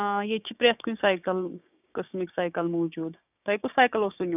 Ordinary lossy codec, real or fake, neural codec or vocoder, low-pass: none; fake; codec, 16 kHz, 6 kbps, DAC; 3.6 kHz